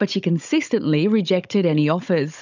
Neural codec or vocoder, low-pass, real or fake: codec, 16 kHz, 16 kbps, FunCodec, trained on Chinese and English, 50 frames a second; 7.2 kHz; fake